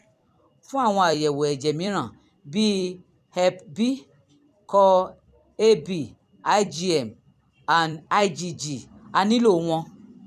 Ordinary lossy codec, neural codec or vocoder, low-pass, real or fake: none; vocoder, 44.1 kHz, 128 mel bands every 256 samples, BigVGAN v2; 14.4 kHz; fake